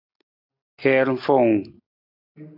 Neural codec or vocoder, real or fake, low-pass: none; real; 5.4 kHz